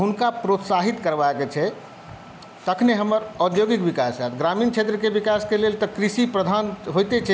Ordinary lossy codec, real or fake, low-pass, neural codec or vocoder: none; real; none; none